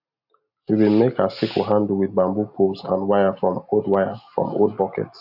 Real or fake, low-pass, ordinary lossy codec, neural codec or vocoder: real; 5.4 kHz; none; none